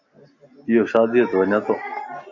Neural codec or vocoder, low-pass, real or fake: none; 7.2 kHz; real